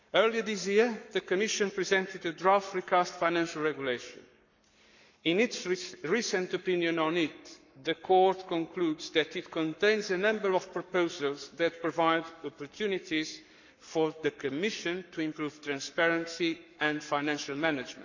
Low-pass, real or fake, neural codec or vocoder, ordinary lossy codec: 7.2 kHz; fake; codec, 44.1 kHz, 7.8 kbps, Pupu-Codec; none